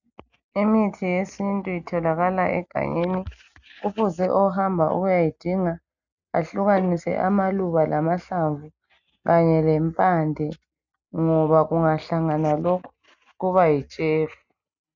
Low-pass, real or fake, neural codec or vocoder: 7.2 kHz; real; none